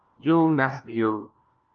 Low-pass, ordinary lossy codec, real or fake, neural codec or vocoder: 7.2 kHz; Opus, 24 kbps; fake; codec, 16 kHz, 1 kbps, FunCodec, trained on LibriTTS, 50 frames a second